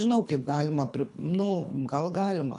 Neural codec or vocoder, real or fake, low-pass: codec, 24 kHz, 3 kbps, HILCodec; fake; 10.8 kHz